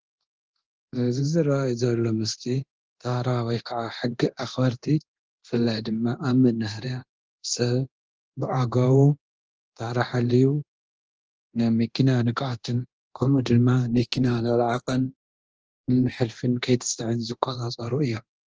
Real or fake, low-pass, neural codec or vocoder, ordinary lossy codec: fake; 7.2 kHz; codec, 24 kHz, 0.9 kbps, DualCodec; Opus, 16 kbps